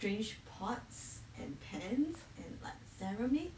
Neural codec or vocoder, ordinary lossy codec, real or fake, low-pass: none; none; real; none